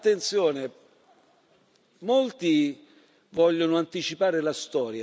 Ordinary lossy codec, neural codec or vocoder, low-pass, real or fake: none; none; none; real